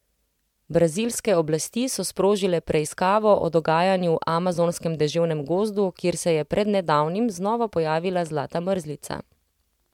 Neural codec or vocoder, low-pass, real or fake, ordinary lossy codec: none; 19.8 kHz; real; MP3, 96 kbps